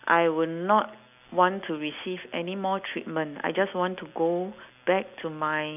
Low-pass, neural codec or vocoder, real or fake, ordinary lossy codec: 3.6 kHz; none; real; none